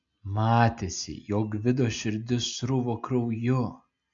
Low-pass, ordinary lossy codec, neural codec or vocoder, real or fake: 7.2 kHz; MP3, 48 kbps; none; real